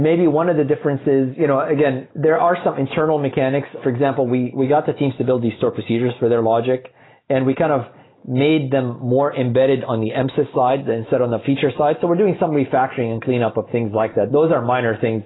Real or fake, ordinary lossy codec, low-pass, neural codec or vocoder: real; AAC, 16 kbps; 7.2 kHz; none